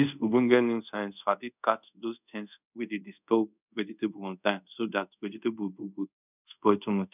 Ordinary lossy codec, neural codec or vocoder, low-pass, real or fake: none; codec, 24 kHz, 0.5 kbps, DualCodec; 3.6 kHz; fake